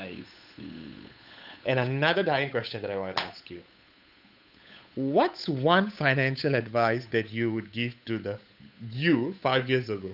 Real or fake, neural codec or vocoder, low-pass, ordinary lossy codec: fake; codec, 16 kHz, 8 kbps, FunCodec, trained on Chinese and English, 25 frames a second; 5.4 kHz; none